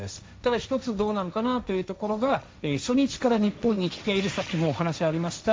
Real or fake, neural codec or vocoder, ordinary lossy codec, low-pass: fake; codec, 16 kHz, 1.1 kbps, Voila-Tokenizer; none; none